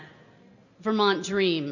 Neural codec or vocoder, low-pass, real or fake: none; 7.2 kHz; real